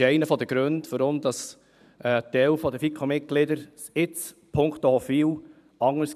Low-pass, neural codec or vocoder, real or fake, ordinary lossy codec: 14.4 kHz; vocoder, 44.1 kHz, 128 mel bands every 512 samples, BigVGAN v2; fake; none